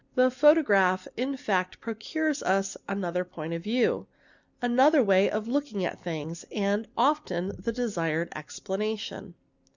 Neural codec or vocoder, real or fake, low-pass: none; real; 7.2 kHz